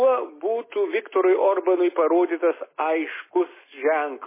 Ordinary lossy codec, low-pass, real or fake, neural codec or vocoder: MP3, 16 kbps; 3.6 kHz; real; none